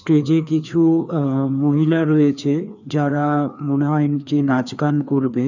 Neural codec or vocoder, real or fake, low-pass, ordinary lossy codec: codec, 16 kHz, 2 kbps, FreqCodec, larger model; fake; 7.2 kHz; none